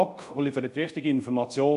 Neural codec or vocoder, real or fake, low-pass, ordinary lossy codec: codec, 24 kHz, 0.5 kbps, DualCodec; fake; 10.8 kHz; none